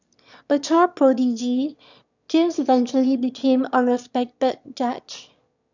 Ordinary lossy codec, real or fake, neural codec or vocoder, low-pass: none; fake; autoencoder, 22.05 kHz, a latent of 192 numbers a frame, VITS, trained on one speaker; 7.2 kHz